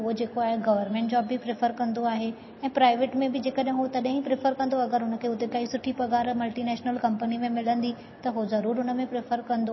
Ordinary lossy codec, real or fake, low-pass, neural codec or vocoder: MP3, 24 kbps; real; 7.2 kHz; none